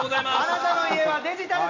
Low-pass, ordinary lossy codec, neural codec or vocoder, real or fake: 7.2 kHz; none; none; real